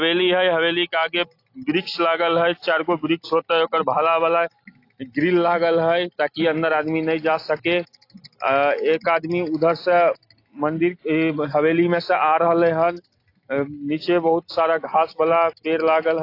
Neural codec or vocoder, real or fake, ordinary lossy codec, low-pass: none; real; AAC, 32 kbps; 5.4 kHz